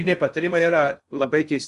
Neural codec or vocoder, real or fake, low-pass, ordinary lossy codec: codec, 16 kHz in and 24 kHz out, 0.6 kbps, FocalCodec, streaming, 2048 codes; fake; 10.8 kHz; Opus, 64 kbps